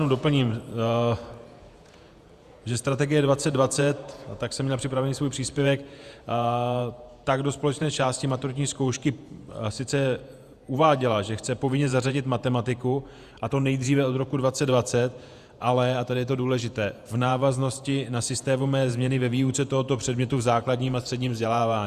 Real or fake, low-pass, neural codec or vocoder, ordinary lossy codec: fake; 14.4 kHz; vocoder, 48 kHz, 128 mel bands, Vocos; Opus, 64 kbps